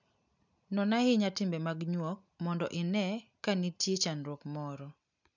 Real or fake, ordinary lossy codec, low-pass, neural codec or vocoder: real; none; 7.2 kHz; none